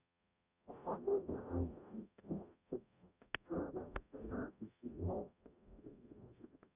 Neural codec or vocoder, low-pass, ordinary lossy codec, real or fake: codec, 44.1 kHz, 0.9 kbps, DAC; 3.6 kHz; none; fake